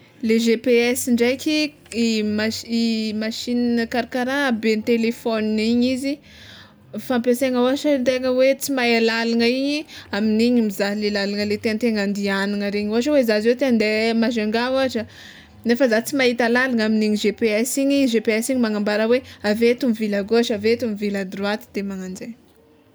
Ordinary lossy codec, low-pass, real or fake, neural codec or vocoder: none; none; real; none